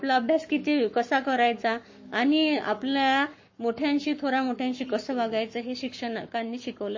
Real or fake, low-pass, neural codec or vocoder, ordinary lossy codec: fake; 7.2 kHz; codec, 44.1 kHz, 7.8 kbps, Pupu-Codec; MP3, 32 kbps